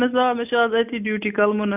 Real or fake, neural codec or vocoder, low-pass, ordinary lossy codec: real; none; 3.6 kHz; none